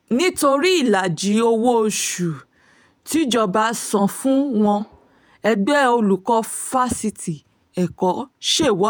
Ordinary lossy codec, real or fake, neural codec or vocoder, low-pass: none; fake; vocoder, 48 kHz, 128 mel bands, Vocos; none